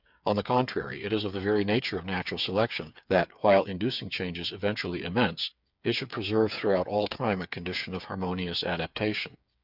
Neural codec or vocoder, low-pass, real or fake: codec, 16 kHz, 8 kbps, FreqCodec, smaller model; 5.4 kHz; fake